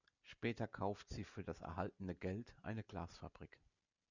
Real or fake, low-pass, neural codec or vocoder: real; 7.2 kHz; none